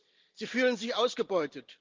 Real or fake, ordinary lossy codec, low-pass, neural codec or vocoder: real; Opus, 32 kbps; 7.2 kHz; none